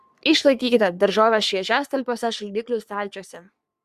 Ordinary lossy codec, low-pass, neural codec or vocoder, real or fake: Opus, 64 kbps; 14.4 kHz; codec, 44.1 kHz, 3.4 kbps, Pupu-Codec; fake